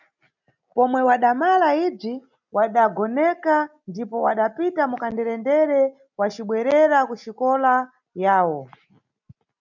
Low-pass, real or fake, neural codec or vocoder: 7.2 kHz; real; none